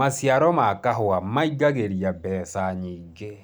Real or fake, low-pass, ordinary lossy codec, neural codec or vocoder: real; none; none; none